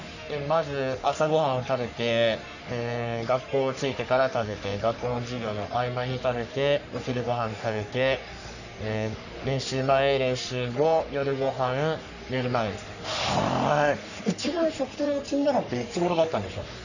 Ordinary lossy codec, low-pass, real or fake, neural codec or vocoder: none; 7.2 kHz; fake; codec, 44.1 kHz, 3.4 kbps, Pupu-Codec